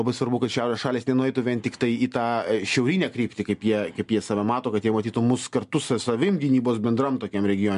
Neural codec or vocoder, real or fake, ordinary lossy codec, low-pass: none; real; MP3, 64 kbps; 10.8 kHz